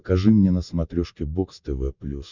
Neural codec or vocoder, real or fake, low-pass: none; real; 7.2 kHz